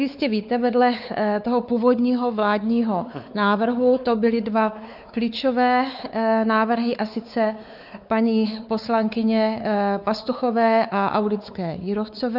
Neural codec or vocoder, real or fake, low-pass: codec, 16 kHz, 4 kbps, X-Codec, WavLM features, trained on Multilingual LibriSpeech; fake; 5.4 kHz